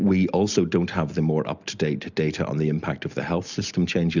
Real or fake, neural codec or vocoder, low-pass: real; none; 7.2 kHz